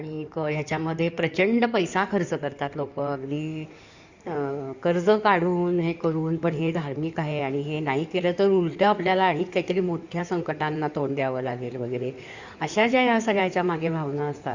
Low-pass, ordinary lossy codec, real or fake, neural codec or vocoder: 7.2 kHz; Opus, 64 kbps; fake; codec, 16 kHz in and 24 kHz out, 2.2 kbps, FireRedTTS-2 codec